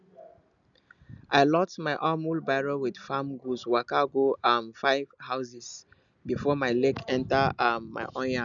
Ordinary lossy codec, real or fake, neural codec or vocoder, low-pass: none; real; none; 7.2 kHz